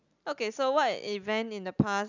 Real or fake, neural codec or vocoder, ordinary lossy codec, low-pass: real; none; none; 7.2 kHz